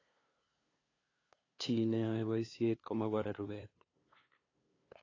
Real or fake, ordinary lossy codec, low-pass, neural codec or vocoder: fake; AAC, 32 kbps; 7.2 kHz; codec, 16 kHz, 2 kbps, FunCodec, trained on LibriTTS, 25 frames a second